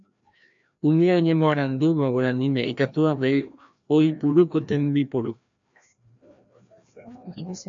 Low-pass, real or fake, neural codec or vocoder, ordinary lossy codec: 7.2 kHz; fake; codec, 16 kHz, 1 kbps, FreqCodec, larger model; AAC, 64 kbps